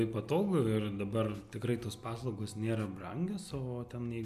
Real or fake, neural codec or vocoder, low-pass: real; none; 14.4 kHz